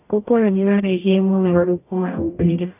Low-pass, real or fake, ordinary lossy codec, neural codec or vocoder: 3.6 kHz; fake; AAC, 32 kbps; codec, 44.1 kHz, 0.9 kbps, DAC